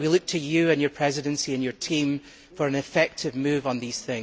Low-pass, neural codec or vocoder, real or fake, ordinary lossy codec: none; none; real; none